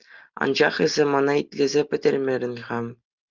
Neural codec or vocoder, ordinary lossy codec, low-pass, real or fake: none; Opus, 24 kbps; 7.2 kHz; real